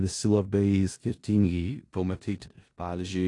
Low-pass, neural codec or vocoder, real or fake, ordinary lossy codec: 10.8 kHz; codec, 16 kHz in and 24 kHz out, 0.4 kbps, LongCat-Audio-Codec, four codebook decoder; fake; AAC, 48 kbps